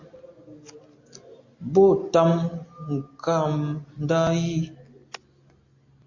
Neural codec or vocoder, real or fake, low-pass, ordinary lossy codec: none; real; 7.2 kHz; MP3, 48 kbps